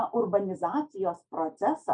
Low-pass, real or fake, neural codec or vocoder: 9.9 kHz; real; none